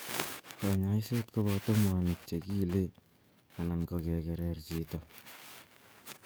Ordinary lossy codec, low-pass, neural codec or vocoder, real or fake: none; none; codec, 44.1 kHz, 7.8 kbps, DAC; fake